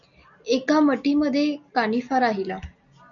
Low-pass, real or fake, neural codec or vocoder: 7.2 kHz; real; none